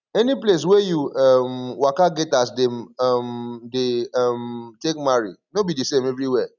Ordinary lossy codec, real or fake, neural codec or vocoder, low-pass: none; real; none; 7.2 kHz